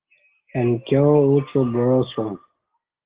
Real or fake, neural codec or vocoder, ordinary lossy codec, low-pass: real; none; Opus, 16 kbps; 3.6 kHz